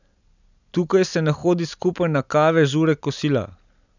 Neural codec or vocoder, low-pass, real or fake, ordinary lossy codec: none; 7.2 kHz; real; none